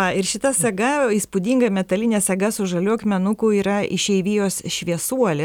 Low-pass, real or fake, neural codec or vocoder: 19.8 kHz; real; none